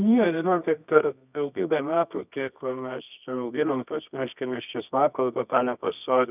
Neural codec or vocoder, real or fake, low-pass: codec, 24 kHz, 0.9 kbps, WavTokenizer, medium music audio release; fake; 3.6 kHz